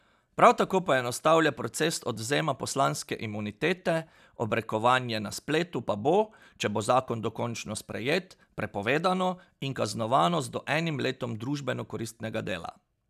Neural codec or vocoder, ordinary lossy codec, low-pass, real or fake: none; none; 14.4 kHz; real